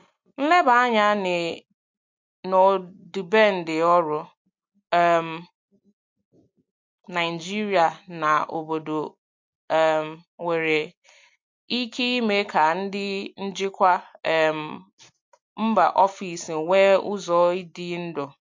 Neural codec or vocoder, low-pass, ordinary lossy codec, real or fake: none; 7.2 kHz; MP3, 48 kbps; real